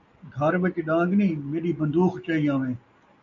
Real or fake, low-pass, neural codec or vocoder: real; 7.2 kHz; none